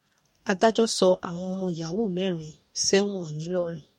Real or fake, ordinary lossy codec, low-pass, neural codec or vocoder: fake; MP3, 64 kbps; 19.8 kHz; codec, 44.1 kHz, 2.6 kbps, DAC